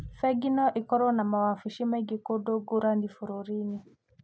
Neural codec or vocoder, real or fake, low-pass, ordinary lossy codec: none; real; none; none